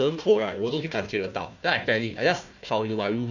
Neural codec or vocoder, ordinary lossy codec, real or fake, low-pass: codec, 16 kHz, 1 kbps, FunCodec, trained on Chinese and English, 50 frames a second; none; fake; 7.2 kHz